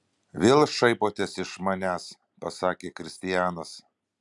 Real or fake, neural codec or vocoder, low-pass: real; none; 10.8 kHz